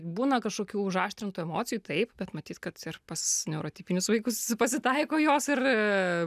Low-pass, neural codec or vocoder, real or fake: 14.4 kHz; none; real